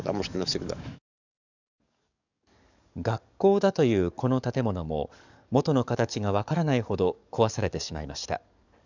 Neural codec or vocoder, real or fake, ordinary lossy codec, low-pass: codec, 44.1 kHz, 7.8 kbps, DAC; fake; none; 7.2 kHz